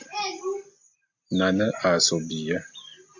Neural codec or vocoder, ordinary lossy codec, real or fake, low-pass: none; MP3, 64 kbps; real; 7.2 kHz